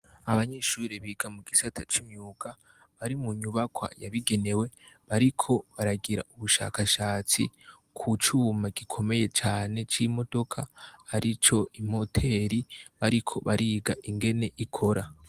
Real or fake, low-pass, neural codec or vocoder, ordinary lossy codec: real; 14.4 kHz; none; Opus, 24 kbps